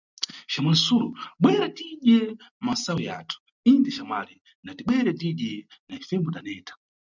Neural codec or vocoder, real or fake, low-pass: none; real; 7.2 kHz